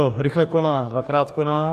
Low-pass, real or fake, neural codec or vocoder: 14.4 kHz; fake; codec, 44.1 kHz, 2.6 kbps, DAC